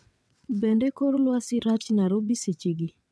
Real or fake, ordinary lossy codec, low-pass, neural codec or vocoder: fake; none; none; vocoder, 22.05 kHz, 80 mel bands, WaveNeXt